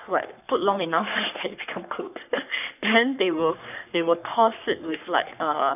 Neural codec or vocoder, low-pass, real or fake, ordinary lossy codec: codec, 44.1 kHz, 3.4 kbps, Pupu-Codec; 3.6 kHz; fake; none